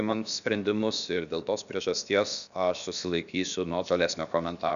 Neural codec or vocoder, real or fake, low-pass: codec, 16 kHz, about 1 kbps, DyCAST, with the encoder's durations; fake; 7.2 kHz